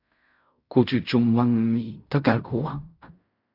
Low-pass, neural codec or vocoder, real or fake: 5.4 kHz; codec, 16 kHz in and 24 kHz out, 0.4 kbps, LongCat-Audio-Codec, fine tuned four codebook decoder; fake